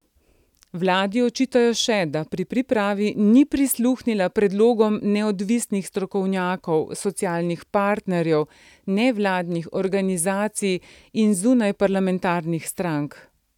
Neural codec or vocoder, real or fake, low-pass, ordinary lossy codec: none; real; 19.8 kHz; none